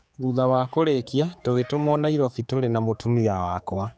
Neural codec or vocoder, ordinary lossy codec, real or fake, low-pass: codec, 16 kHz, 4 kbps, X-Codec, HuBERT features, trained on general audio; none; fake; none